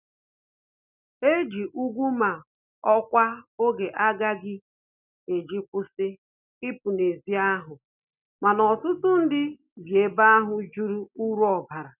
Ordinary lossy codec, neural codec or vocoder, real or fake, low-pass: none; none; real; 3.6 kHz